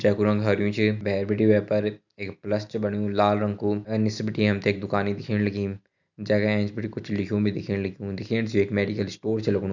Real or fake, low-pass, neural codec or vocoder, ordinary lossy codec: real; 7.2 kHz; none; none